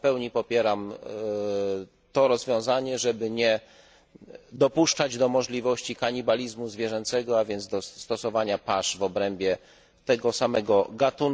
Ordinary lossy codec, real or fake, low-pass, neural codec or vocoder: none; real; none; none